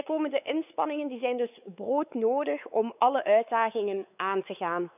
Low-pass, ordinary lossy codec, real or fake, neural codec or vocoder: 3.6 kHz; none; fake; codec, 16 kHz, 4 kbps, X-Codec, WavLM features, trained on Multilingual LibriSpeech